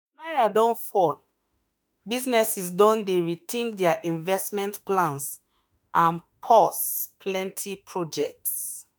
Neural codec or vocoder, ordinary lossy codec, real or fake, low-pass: autoencoder, 48 kHz, 32 numbers a frame, DAC-VAE, trained on Japanese speech; none; fake; none